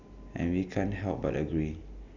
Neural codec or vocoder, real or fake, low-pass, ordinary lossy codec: none; real; 7.2 kHz; none